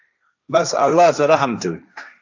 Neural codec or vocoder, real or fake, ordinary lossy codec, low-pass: codec, 16 kHz, 1.1 kbps, Voila-Tokenizer; fake; AAC, 48 kbps; 7.2 kHz